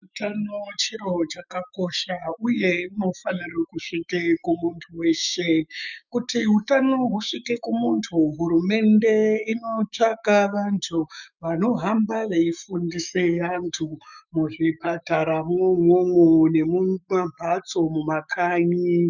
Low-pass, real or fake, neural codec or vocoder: 7.2 kHz; fake; autoencoder, 48 kHz, 128 numbers a frame, DAC-VAE, trained on Japanese speech